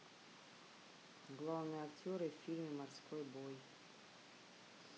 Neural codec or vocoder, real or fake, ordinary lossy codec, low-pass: none; real; none; none